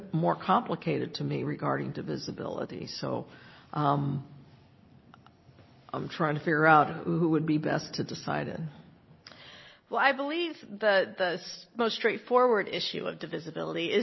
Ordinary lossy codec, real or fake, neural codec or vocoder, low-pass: MP3, 24 kbps; real; none; 7.2 kHz